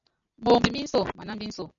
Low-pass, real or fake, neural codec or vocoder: 7.2 kHz; real; none